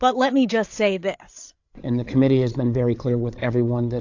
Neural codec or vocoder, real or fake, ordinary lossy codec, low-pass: codec, 16 kHz, 8 kbps, FreqCodec, larger model; fake; AAC, 48 kbps; 7.2 kHz